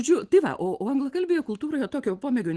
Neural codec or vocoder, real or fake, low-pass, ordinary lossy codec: none; real; 10.8 kHz; Opus, 16 kbps